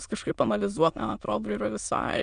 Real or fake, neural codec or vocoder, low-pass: fake; autoencoder, 22.05 kHz, a latent of 192 numbers a frame, VITS, trained on many speakers; 9.9 kHz